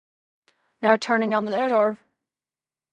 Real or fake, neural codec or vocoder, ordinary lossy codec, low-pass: fake; codec, 16 kHz in and 24 kHz out, 0.4 kbps, LongCat-Audio-Codec, fine tuned four codebook decoder; none; 10.8 kHz